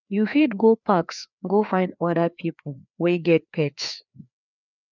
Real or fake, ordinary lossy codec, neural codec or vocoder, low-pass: fake; none; codec, 16 kHz, 2 kbps, FreqCodec, larger model; 7.2 kHz